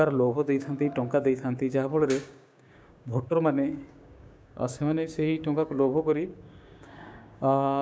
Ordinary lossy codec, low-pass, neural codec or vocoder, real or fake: none; none; codec, 16 kHz, 6 kbps, DAC; fake